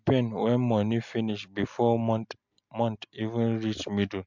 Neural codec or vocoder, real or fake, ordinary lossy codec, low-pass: none; real; MP3, 64 kbps; 7.2 kHz